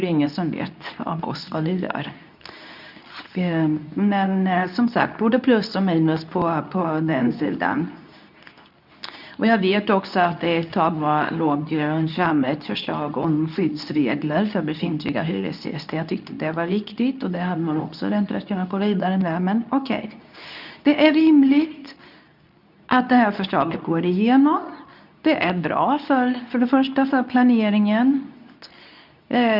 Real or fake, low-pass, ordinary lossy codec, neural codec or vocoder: fake; 5.4 kHz; none; codec, 24 kHz, 0.9 kbps, WavTokenizer, medium speech release version 2